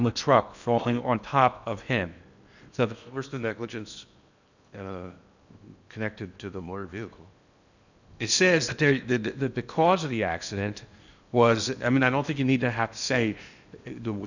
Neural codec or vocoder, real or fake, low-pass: codec, 16 kHz in and 24 kHz out, 0.8 kbps, FocalCodec, streaming, 65536 codes; fake; 7.2 kHz